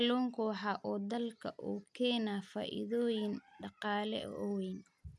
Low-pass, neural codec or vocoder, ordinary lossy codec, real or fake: none; none; none; real